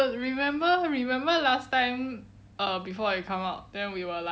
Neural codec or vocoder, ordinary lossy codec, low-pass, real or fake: none; none; none; real